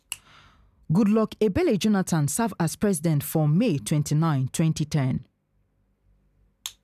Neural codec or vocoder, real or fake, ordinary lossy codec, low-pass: none; real; none; 14.4 kHz